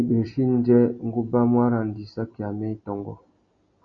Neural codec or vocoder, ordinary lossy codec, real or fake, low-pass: none; Opus, 64 kbps; real; 7.2 kHz